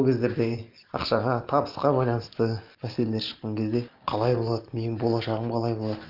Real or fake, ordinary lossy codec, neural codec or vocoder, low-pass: real; Opus, 32 kbps; none; 5.4 kHz